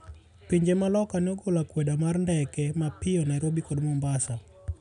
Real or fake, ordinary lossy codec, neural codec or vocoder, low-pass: real; none; none; 10.8 kHz